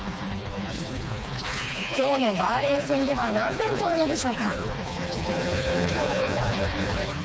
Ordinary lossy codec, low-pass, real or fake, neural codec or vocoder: none; none; fake; codec, 16 kHz, 2 kbps, FreqCodec, smaller model